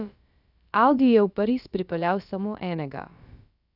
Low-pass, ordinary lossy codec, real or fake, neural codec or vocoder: 5.4 kHz; none; fake; codec, 16 kHz, about 1 kbps, DyCAST, with the encoder's durations